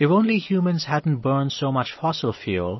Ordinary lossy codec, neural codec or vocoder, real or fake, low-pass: MP3, 24 kbps; none; real; 7.2 kHz